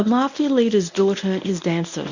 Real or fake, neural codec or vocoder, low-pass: fake; codec, 24 kHz, 0.9 kbps, WavTokenizer, medium speech release version 1; 7.2 kHz